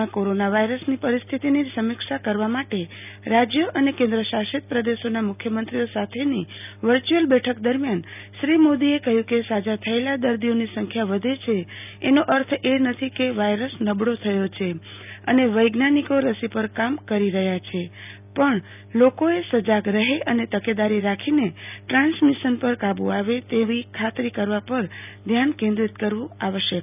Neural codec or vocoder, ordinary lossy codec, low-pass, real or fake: none; none; 3.6 kHz; real